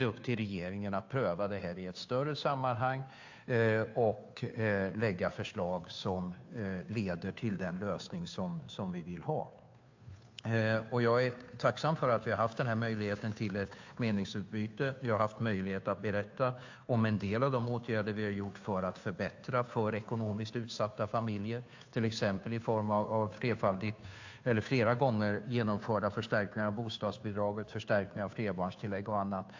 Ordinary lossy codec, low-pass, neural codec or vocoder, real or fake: AAC, 48 kbps; 7.2 kHz; codec, 16 kHz, 2 kbps, FunCodec, trained on Chinese and English, 25 frames a second; fake